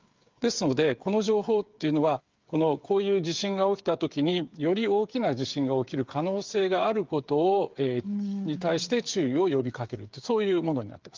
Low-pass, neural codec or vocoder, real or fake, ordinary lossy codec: 7.2 kHz; codec, 16 kHz, 8 kbps, FreqCodec, smaller model; fake; Opus, 32 kbps